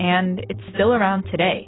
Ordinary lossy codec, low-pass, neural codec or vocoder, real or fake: AAC, 16 kbps; 7.2 kHz; none; real